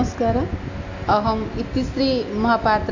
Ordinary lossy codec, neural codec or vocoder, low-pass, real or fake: AAC, 48 kbps; none; 7.2 kHz; real